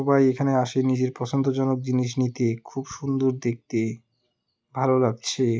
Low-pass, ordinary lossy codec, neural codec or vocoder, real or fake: none; none; none; real